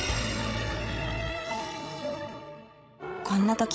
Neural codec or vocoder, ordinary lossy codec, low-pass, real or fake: codec, 16 kHz, 16 kbps, FreqCodec, larger model; none; none; fake